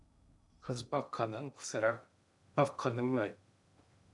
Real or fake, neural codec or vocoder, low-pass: fake; codec, 16 kHz in and 24 kHz out, 0.6 kbps, FocalCodec, streaming, 2048 codes; 10.8 kHz